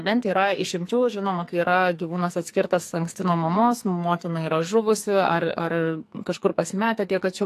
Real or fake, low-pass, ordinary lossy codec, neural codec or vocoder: fake; 14.4 kHz; AAC, 64 kbps; codec, 44.1 kHz, 2.6 kbps, SNAC